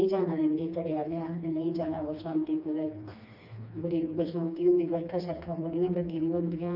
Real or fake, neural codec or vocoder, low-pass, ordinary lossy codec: fake; codec, 16 kHz, 2 kbps, FreqCodec, smaller model; 5.4 kHz; none